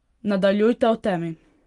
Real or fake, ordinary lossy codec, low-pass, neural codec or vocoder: real; Opus, 24 kbps; 10.8 kHz; none